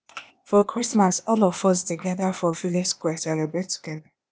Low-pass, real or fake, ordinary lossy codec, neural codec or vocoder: none; fake; none; codec, 16 kHz, 0.8 kbps, ZipCodec